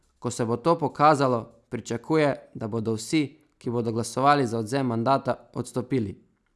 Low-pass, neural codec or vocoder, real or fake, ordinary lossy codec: none; none; real; none